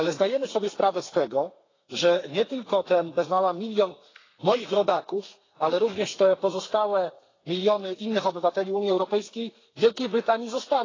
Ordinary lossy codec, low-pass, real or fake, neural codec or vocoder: AAC, 32 kbps; 7.2 kHz; fake; codec, 32 kHz, 1.9 kbps, SNAC